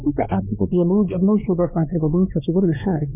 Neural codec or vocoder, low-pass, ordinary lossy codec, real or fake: codec, 16 kHz, 2 kbps, X-Codec, WavLM features, trained on Multilingual LibriSpeech; 3.6 kHz; none; fake